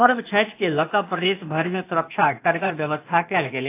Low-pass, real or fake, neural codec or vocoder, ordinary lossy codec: 3.6 kHz; fake; codec, 16 kHz, 0.8 kbps, ZipCodec; AAC, 24 kbps